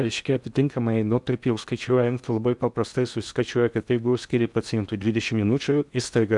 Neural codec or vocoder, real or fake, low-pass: codec, 16 kHz in and 24 kHz out, 0.8 kbps, FocalCodec, streaming, 65536 codes; fake; 10.8 kHz